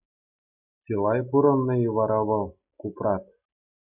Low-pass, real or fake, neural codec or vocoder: 3.6 kHz; real; none